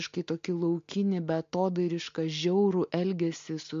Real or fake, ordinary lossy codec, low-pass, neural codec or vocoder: real; MP3, 48 kbps; 7.2 kHz; none